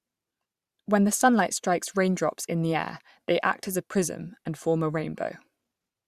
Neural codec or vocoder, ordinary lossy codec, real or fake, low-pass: vocoder, 44.1 kHz, 128 mel bands every 512 samples, BigVGAN v2; Opus, 64 kbps; fake; 14.4 kHz